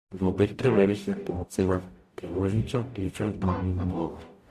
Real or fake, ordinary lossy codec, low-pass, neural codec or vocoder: fake; MP3, 64 kbps; 14.4 kHz; codec, 44.1 kHz, 0.9 kbps, DAC